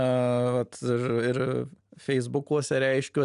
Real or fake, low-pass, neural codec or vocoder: real; 10.8 kHz; none